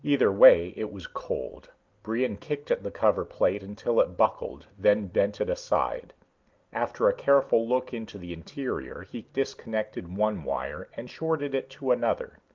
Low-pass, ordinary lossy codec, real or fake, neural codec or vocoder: 7.2 kHz; Opus, 32 kbps; real; none